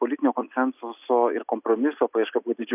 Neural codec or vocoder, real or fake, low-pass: none; real; 3.6 kHz